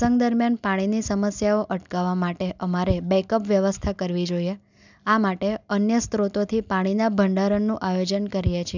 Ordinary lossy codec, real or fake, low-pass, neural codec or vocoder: none; real; 7.2 kHz; none